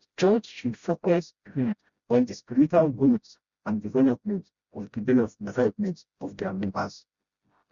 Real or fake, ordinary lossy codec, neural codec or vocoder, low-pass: fake; Opus, 64 kbps; codec, 16 kHz, 0.5 kbps, FreqCodec, smaller model; 7.2 kHz